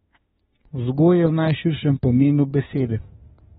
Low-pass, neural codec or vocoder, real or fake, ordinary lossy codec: 19.8 kHz; autoencoder, 48 kHz, 32 numbers a frame, DAC-VAE, trained on Japanese speech; fake; AAC, 16 kbps